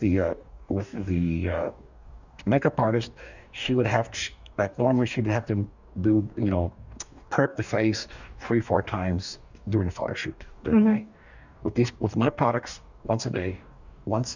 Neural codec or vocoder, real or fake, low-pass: codec, 44.1 kHz, 2.6 kbps, DAC; fake; 7.2 kHz